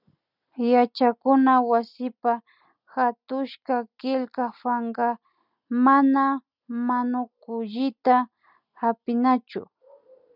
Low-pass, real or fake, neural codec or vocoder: 5.4 kHz; real; none